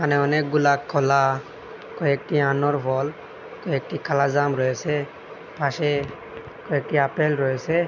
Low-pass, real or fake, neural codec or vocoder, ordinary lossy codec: 7.2 kHz; real; none; none